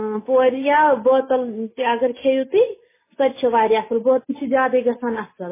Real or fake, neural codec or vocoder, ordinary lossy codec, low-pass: real; none; MP3, 16 kbps; 3.6 kHz